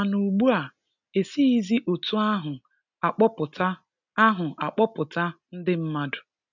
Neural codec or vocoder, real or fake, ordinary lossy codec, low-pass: none; real; none; 7.2 kHz